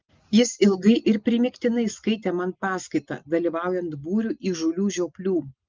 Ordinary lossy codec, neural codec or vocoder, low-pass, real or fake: Opus, 24 kbps; none; 7.2 kHz; real